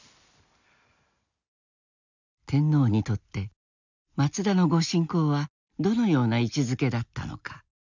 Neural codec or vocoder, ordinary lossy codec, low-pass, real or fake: none; none; 7.2 kHz; real